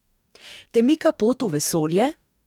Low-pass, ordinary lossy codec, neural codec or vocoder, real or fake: 19.8 kHz; none; codec, 44.1 kHz, 2.6 kbps, DAC; fake